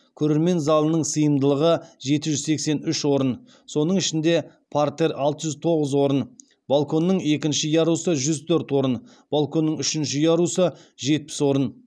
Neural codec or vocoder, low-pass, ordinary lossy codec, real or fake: none; none; none; real